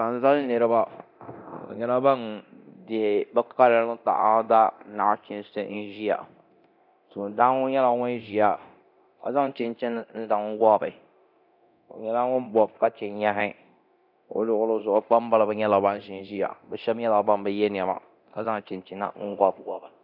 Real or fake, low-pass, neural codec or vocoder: fake; 5.4 kHz; codec, 24 kHz, 0.9 kbps, DualCodec